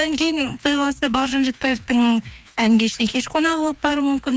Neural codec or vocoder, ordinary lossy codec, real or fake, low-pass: codec, 16 kHz, 2 kbps, FreqCodec, larger model; none; fake; none